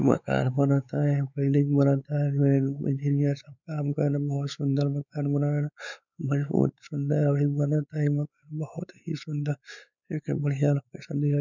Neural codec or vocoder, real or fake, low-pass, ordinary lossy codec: codec, 16 kHz, 4 kbps, X-Codec, WavLM features, trained on Multilingual LibriSpeech; fake; 7.2 kHz; none